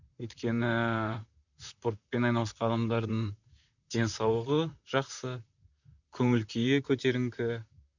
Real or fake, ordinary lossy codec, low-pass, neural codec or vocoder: fake; none; 7.2 kHz; vocoder, 44.1 kHz, 128 mel bands, Pupu-Vocoder